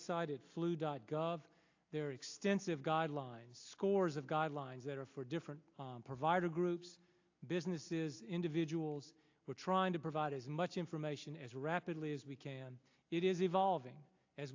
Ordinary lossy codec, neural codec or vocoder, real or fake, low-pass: AAC, 48 kbps; none; real; 7.2 kHz